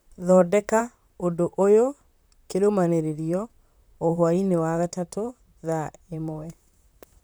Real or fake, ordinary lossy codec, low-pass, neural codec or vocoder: fake; none; none; vocoder, 44.1 kHz, 128 mel bands, Pupu-Vocoder